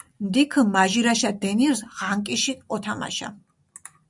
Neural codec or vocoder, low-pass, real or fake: none; 10.8 kHz; real